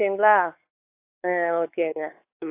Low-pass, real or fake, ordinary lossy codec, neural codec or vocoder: 3.6 kHz; fake; AAC, 24 kbps; codec, 16 kHz, 4 kbps, X-Codec, WavLM features, trained on Multilingual LibriSpeech